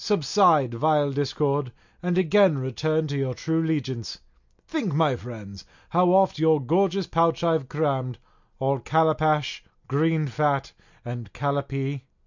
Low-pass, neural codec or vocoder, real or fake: 7.2 kHz; none; real